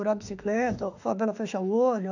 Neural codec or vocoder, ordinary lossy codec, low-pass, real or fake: autoencoder, 48 kHz, 32 numbers a frame, DAC-VAE, trained on Japanese speech; none; 7.2 kHz; fake